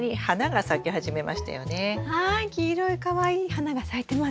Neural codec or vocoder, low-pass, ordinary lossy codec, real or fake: none; none; none; real